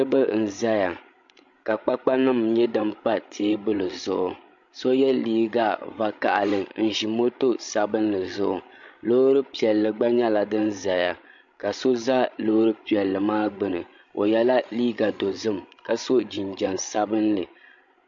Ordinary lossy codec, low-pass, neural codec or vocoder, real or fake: MP3, 48 kbps; 7.2 kHz; codec, 16 kHz, 16 kbps, FreqCodec, larger model; fake